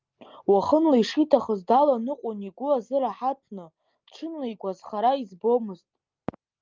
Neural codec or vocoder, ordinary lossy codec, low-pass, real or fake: none; Opus, 24 kbps; 7.2 kHz; real